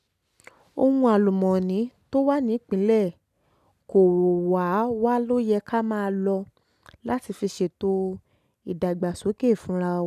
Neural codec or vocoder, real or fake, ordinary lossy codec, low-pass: none; real; none; 14.4 kHz